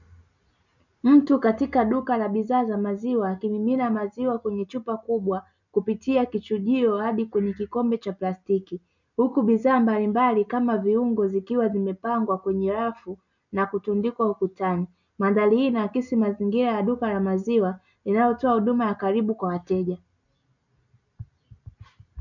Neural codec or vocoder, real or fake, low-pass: none; real; 7.2 kHz